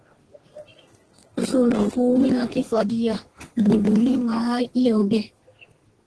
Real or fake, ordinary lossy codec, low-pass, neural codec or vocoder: fake; Opus, 16 kbps; 10.8 kHz; codec, 32 kHz, 1.9 kbps, SNAC